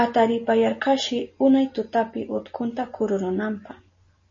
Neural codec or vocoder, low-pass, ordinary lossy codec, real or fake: none; 7.2 kHz; MP3, 32 kbps; real